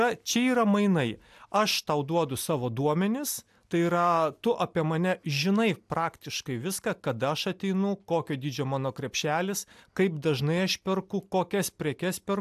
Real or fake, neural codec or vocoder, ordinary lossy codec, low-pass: real; none; AAC, 96 kbps; 14.4 kHz